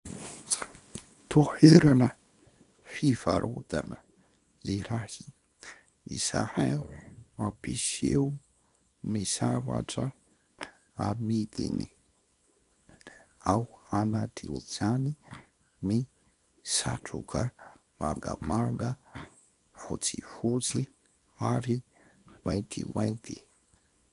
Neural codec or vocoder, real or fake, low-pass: codec, 24 kHz, 0.9 kbps, WavTokenizer, small release; fake; 10.8 kHz